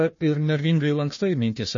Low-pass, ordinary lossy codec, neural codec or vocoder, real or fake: 7.2 kHz; MP3, 32 kbps; codec, 16 kHz, 1 kbps, FunCodec, trained on Chinese and English, 50 frames a second; fake